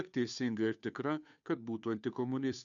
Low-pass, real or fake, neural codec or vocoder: 7.2 kHz; fake; codec, 16 kHz, 2 kbps, FunCodec, trained on Chinese and English, 25 frames a second